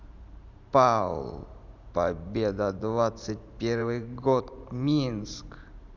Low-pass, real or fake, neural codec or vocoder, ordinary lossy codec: 7.2 kHz; fake; vocoder, 44.1 kHz, 128 mel bands every 512 samples, BigVGAN v2; none